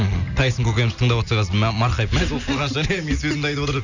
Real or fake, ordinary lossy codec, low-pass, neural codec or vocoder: real; none; 7.2 kHz; none